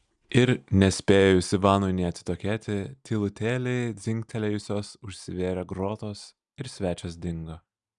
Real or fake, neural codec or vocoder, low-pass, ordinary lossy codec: real; none; 10.8 kHz; Opus, 64 kbps